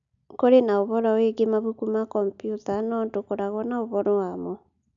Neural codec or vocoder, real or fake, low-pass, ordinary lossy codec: none; real; 7.2 kHz; none